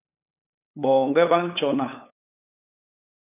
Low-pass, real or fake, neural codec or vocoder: 3.6 kHz; fake; codec, 16 kHz, 8 kbps, FunCodec, trained on LibriTTS, 25 frames a second